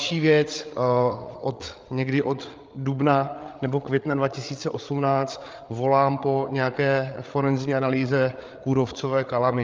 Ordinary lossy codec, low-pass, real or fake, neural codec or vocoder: Opus, 24 kbps; 7.2 kHz; fake; codec, 16 kHz, 8 kbps, FreqCodec, larger model